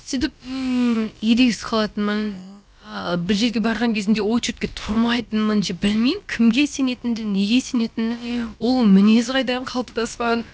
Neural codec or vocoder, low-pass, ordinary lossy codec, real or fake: codec, 16 kHz, about 1 kbps, DyCAST, with the encoder's durations; none; none; fake